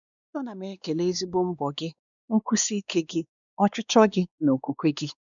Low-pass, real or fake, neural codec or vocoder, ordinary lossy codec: 7.2 kHz; fake; codec, 16 kHz, 2 kbps, X-Codec, WavLM features, trained on Multilingual LibriSpeech; none